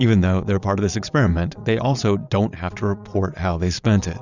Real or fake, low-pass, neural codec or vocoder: fake; 7.2 kHz; vocoder, 22.05 kHz, 80 mel bands, Vocos